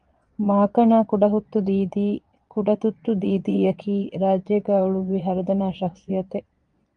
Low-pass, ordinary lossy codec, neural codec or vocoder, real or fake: 9.9 kHz; Opus, 32 kbps; vocoder, 22.05 kHz, 80 mel bands, Vocos; fake